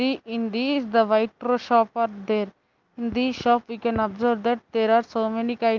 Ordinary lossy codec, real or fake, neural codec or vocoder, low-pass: Opus, 32 kbps; real; none; 7.2 kHz